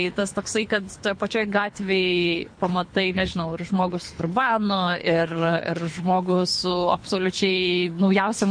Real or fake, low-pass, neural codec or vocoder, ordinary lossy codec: fake; 9.9 kHz; codec, 24 kHz, 3 kbps, HILCodec; MP3, 48 kbps